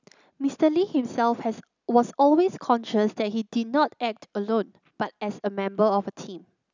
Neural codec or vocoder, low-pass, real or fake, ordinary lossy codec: none; 7.2 kHz; real; none